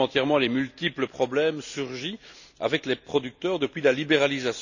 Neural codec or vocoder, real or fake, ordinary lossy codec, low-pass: none; real; none; 7.2 kHz